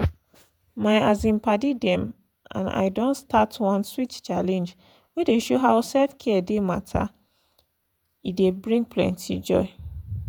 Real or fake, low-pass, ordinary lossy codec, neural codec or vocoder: fake; 19.8 kHz; none; vocoder, 48 kHz, 128 mel bands, Vocos